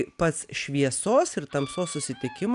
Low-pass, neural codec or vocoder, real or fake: 10.8 kHz; none; real